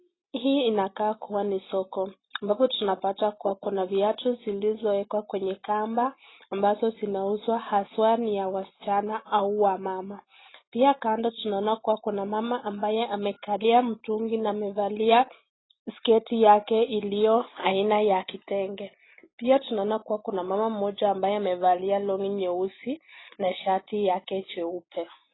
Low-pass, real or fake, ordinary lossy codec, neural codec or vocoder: 7.2 kHz; real; AAC, 16 kbps; none